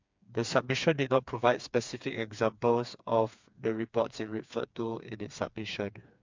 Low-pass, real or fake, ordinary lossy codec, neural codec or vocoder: 7.2 kHz; fake; MP3, 64 kbps; codec, 16 kHz, 4 kbps, FreqCodec, smaller model